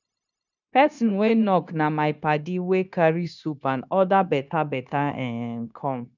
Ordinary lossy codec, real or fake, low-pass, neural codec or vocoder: none; fake; 7.2 kHz; codec, 16 kHz, 0.9 kbps, LongCat-Audio-Codec